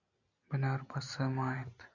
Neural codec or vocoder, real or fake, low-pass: none; real; 7.2 kHz